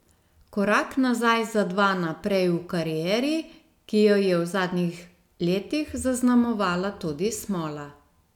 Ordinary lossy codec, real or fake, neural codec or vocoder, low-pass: none; real; none; 19.8 kHz